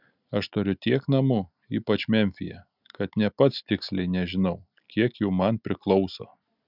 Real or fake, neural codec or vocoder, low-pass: real; none; 5.4 kHz